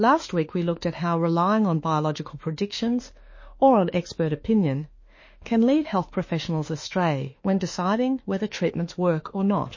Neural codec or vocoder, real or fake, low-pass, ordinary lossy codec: autoencoder, 48 kHz, 32 numbers a frame, DAC-VAE, trained on Japanese speech; fake; 7.2 kHz; MP3, 32 kbps